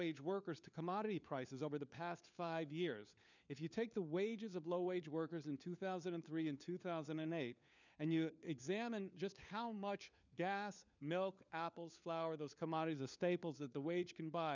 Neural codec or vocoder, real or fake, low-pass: codec, 16 kHz, 4 kbps, FunCodec, trained on LibriTTS, 50 frames a second; fake; 7.2 kHz